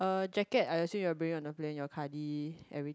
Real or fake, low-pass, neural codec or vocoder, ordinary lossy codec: real; none; none; none